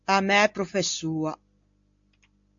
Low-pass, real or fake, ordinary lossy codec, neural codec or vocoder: 7.2 kHz; real; AAC, 64 kbps; none